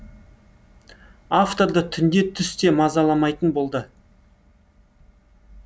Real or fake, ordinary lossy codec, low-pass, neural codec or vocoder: real; none; none; none